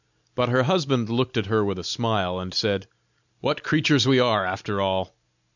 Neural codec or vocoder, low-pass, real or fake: none; 7.2 kHz; real